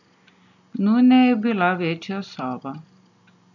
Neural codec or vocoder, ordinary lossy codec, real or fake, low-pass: none; none; real; 7.2 kHz